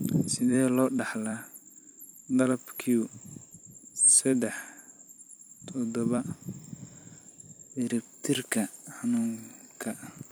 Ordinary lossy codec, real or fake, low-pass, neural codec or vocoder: none; fake; none; vocoder, 44.1 kHz, 128 mel bands every 256 samples, BigVGAN v2